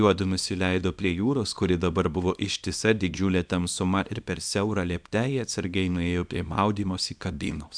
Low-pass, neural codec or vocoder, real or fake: 9.9 kHz; codec, 24 kHz, 0.9 kbps, WavTokenizer, small release; fake